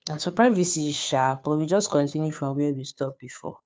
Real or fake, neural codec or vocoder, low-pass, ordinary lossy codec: fake; codec, 16 kHz, 2 kbps, FunCodec, trained on Chinese and English, 25 frames a second; none; none